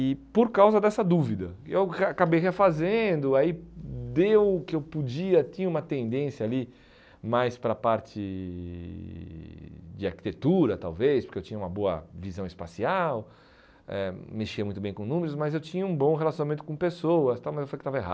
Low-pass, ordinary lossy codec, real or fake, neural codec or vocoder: none; none; real; none